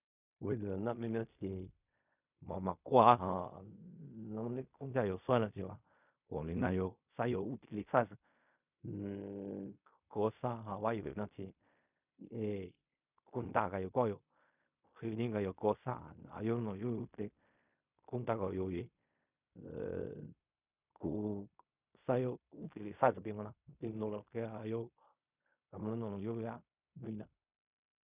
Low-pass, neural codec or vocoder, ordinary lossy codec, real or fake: 3.6 kHz; codec, 16 kHz in and 24 kHz out, 0.4 kbps, LongCat-Audio-Codec, fine tuned four codebook decoder; none; fake